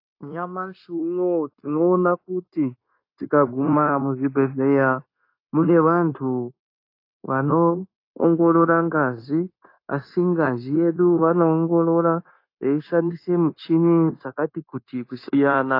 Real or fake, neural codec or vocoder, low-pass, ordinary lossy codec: fake; codec, 16 kHz, 0.9 kbps, LongCat-Audio-Codec; 5.4 kHz; AAC, 32 kbps